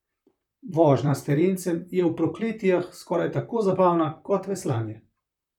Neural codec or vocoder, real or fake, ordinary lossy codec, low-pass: vocoder, 44.1 kHz, 128 mel bands, Pupu-Vocoder; fake; none; 19.8 kHz